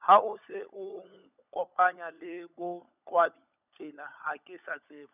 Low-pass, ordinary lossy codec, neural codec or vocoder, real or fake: 3.6 kHz; none; codec, 16 kHz, 16 kbps, FunCodec, trained on LibriTTS, 50 frames a second; fake